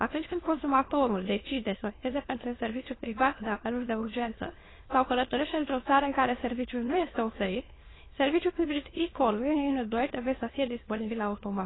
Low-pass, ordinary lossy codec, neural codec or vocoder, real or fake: 7.2 kHz; AAC, 16 kbps; autoencoder, 22.05 kHz, a latent of 192 numbers a frame, VITS, trained on many speakers; fake